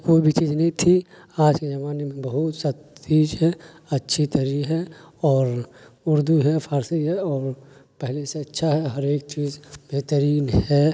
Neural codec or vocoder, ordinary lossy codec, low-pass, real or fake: none; none; none; real